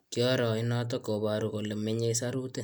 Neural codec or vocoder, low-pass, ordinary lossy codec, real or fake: none; none; none; real